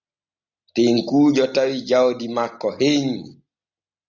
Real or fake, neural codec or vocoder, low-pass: real; none; 7.2 kHz